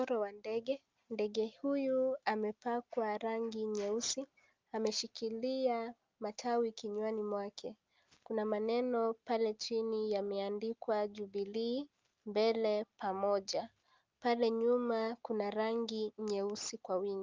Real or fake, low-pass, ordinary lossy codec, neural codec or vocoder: real; 7.2 kHz; Opus, 24 kbps; none